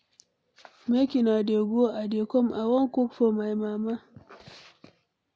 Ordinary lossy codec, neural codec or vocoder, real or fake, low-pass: none; none; real; none